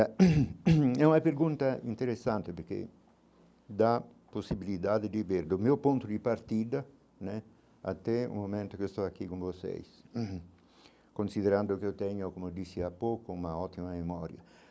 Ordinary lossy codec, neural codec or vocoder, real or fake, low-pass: none; none; real; none